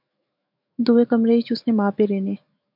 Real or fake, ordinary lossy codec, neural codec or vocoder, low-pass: fake; MP3, 48 kbps; autoencoder, 48 kHz, 128 numbers a frame, DAC-VAE, trained on Japanese speech; 5.4 kHz